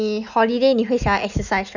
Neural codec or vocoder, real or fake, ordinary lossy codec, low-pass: none; real; none; 7.2 kHz